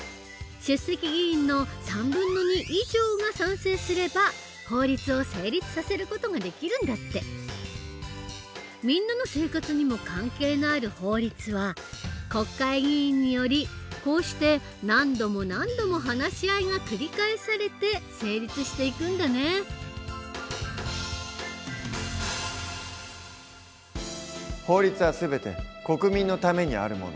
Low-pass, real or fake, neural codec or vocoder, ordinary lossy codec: none; real; none; none